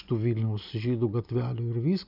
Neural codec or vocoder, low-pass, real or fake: none; 5.4 kHz; real